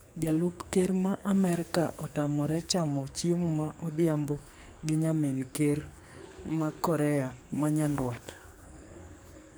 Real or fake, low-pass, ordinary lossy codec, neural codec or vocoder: fake; none; none; codec, 44.1 kHz, 2.6 kbps, SNAC